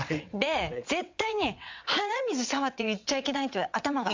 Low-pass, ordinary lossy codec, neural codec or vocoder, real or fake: 7.2 kHz; MP3, 64 kbps; vocoder, 22.05 kHz, 80 mel bands, WaveNeXt; fake